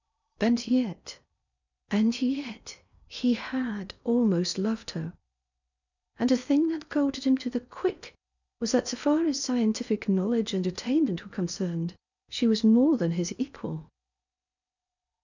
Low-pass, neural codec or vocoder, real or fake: 7.2 kHz; codec, 16 kHz in and 24 kHz out, 0.8 kbps, FocalCodec, streaming, 65536 codes; fake